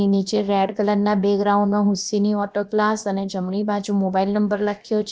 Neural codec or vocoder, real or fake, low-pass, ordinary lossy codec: codec, 16 kHz, about 1 kbps, DyCAST, with the encoder's durations; fake; none; none